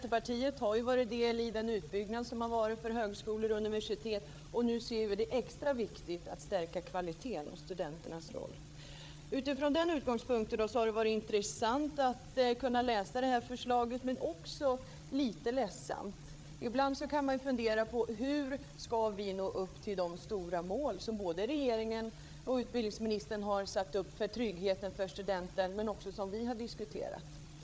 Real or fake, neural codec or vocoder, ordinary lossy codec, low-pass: fake; codec, 16 kHz, 8 kbps, FreqCodec, larger model; none; none